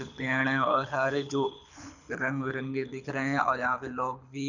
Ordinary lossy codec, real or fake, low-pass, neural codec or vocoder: none; fake; 7.2 kHz; codec, 24 kHz, 6 kbps, HILCodec